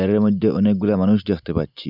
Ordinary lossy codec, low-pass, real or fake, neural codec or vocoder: none; 5.4 kHz; real; none